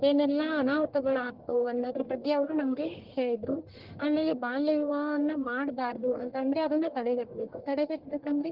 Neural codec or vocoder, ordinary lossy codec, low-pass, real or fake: codec, 44.1 kHz, 1.7 kbps, Pupu-Codec; Opus, 16 kbps; 5.4 kHz; fake